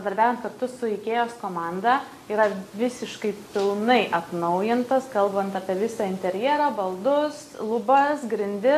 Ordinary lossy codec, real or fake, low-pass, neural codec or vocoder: AAC, 64 kbps; real; 14.4 kHz; none